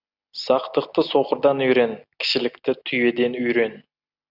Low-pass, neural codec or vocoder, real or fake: 5.4 kHz; none; real